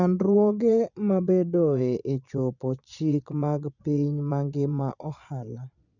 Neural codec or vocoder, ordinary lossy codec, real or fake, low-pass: vocoder, 22.05 kHz, 80 mel bands, Vocos; none; fake; 7.2 kHz